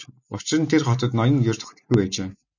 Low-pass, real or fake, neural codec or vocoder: 7.2 kHz; real; none